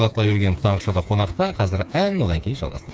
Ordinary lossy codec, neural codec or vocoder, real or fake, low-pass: none; codec, 16 kHz, 4 kbps, FreqCodec, smaller model; fake; none